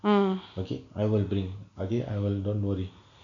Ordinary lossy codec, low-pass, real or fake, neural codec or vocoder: none; 7.2 kHz; real; none